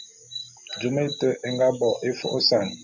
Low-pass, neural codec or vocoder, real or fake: 7.2 kHz; none; real